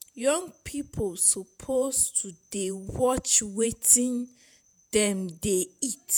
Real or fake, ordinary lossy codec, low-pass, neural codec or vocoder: fake; none; none; vocoder, 48 kHz, 128 mel bands, Vocos